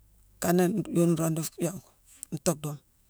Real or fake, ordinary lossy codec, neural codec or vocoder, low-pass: fake; none; autoencoder, 48 kHz, 128 numbers a frame, DAC-VAE, trained on Japanese speech; none